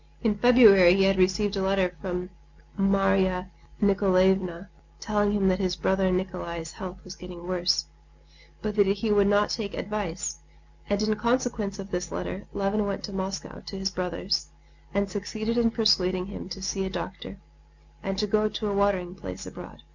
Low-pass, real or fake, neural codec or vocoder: 7.2 kHz; real; none